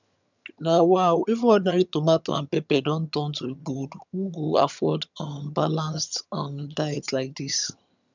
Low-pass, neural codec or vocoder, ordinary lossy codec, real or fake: 7.2 kHz; vocoder, 22.05 kHz, 80 mel bands, HiFi-GAN; none; fake